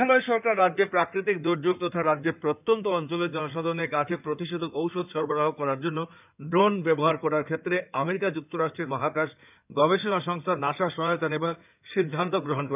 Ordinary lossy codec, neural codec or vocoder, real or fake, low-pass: none; codec, 16 kHz in and 24 kHz out, 2.2 kbps, FireRedTTS-2 codec; fake; 3.6 kHz